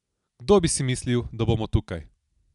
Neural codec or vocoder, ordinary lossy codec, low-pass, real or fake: none; none; 10.8 kHz; real